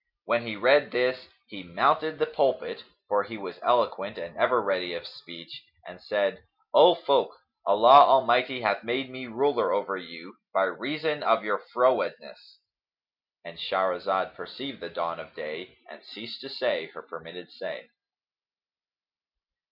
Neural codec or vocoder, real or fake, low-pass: none; real; 5.4 kHz